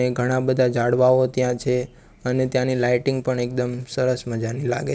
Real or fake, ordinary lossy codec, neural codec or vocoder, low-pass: real; none; none; none